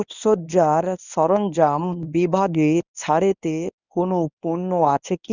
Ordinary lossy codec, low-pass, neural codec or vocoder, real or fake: none; 7.2 kHz; codec, 24 kHz, 0.9 kbps, WavTokenizer, medium speech release version 1; fake